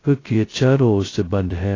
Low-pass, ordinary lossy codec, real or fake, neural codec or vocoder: 7.2 kHz; AAC, 32 kbps; fake; codec, 16 kHz, 0.2 kbps, FocalCodec